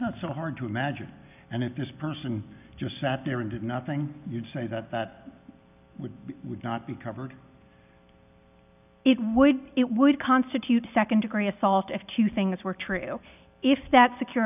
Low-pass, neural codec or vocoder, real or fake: 3.6 kHz; none; real